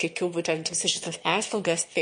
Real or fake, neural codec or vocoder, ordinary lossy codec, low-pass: fake; autoencoder, 22.05 kHz, a latent of 192 numbers a frame, VITS, trained on one speaker; MP3, 48 kbps; 9.9 kHz